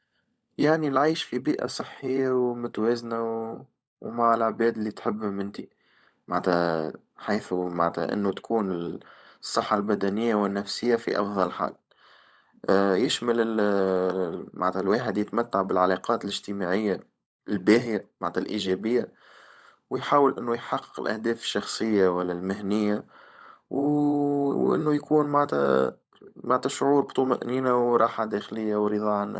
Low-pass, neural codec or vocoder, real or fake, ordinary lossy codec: none; codec, 16 kHz, 16 kbps, FunCodec, trained on LibriTTS, 50 frames a second; fake; none